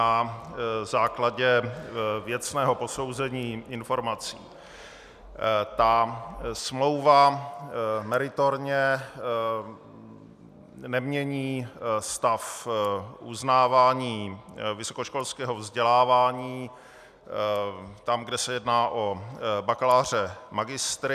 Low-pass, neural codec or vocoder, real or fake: 14.4 kHz; none; real